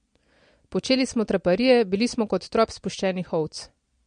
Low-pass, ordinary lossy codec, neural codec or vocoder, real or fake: 9.9 kHz; MP3, 48 kbps; none; real